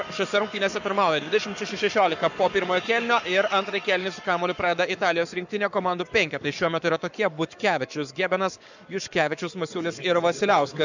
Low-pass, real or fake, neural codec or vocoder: 7.2 kHz; fake; codec, 44.1 kHz, 7.8 kbps, Pupu-Codec